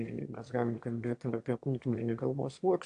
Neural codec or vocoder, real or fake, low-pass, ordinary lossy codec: autoencoder, 22.05 kHz, a latent of 192 numbers a frame, VITS, trained on one speaker; fake; 9.9 kHz; AAC, 64 kbps